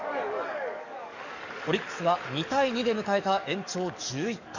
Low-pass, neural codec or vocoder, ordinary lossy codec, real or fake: 7.2 kHz; codec, 44.1 kHz, 7.8 kbps, DAC; none; fake